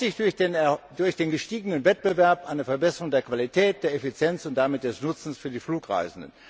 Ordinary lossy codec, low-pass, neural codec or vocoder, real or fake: none; none; none; real